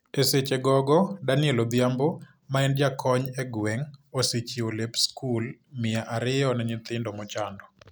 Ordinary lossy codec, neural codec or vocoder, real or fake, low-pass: none; none; real; none